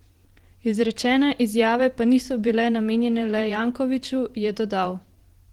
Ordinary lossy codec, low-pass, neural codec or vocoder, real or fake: Opus, 16 kbps; 19.8 kHz; vocoder, 44.1 kHz, 128 mel bands every 512 samples, BigVGAN v2; fake